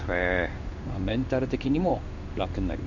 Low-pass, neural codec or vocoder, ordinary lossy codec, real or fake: 7.2 kHz; codec, 16 kHz in and 24 kHz out, 1 kbps, XY-Tokenizer; none; fake